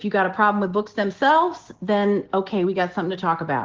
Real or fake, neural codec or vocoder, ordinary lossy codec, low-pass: real; none; Opus, 16 kbps; 7.2 kHz